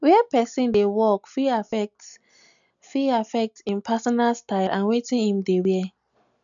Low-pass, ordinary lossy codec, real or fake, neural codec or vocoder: 7.2 kHz; none; real; none